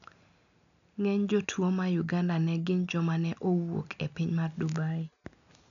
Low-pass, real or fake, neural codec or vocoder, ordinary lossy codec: 7.2 kHz; real; none; none